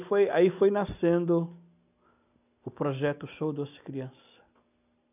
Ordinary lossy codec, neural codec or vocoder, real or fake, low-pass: none; none; real; 3.6 kHz